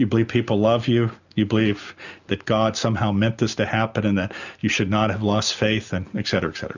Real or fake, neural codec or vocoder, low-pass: real; none; 7.2 kHz